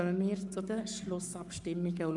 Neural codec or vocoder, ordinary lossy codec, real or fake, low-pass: codec, 44.1 kHz, 7.8 kbps, Pupu-Codec; none; fake; 10.8 kHz